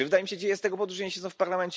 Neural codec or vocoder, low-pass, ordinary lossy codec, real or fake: none; none; none; real